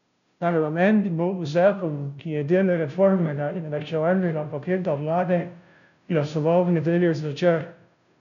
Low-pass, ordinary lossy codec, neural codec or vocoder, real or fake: 7.2 kHz; none; codec, 16 kHz, 0.5 kbps, FunCodec, trained on Chinese and English, 25 frames a second; fake